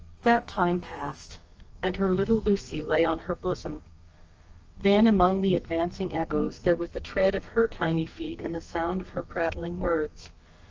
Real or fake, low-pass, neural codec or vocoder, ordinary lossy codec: fake; 7.2 kHz; codec, 32 kHz, 1.9 kbps, SNAC; Opus, 24 kbps